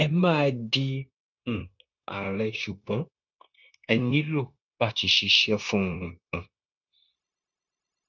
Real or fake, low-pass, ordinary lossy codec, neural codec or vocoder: fake; 7.2 kHz; none; codec, 16 kHz, 0.9 kbps, LongCat-Audio-Codec